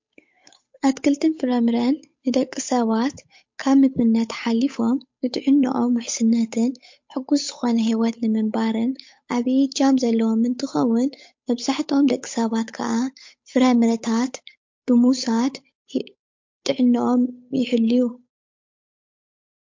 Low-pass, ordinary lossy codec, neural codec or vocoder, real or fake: 7.2 kHz; MP3, 48 kbps; codec, 16 kHz, 8 kbps, FunCodec, trained on Chinese and English, 25 frames a second; fake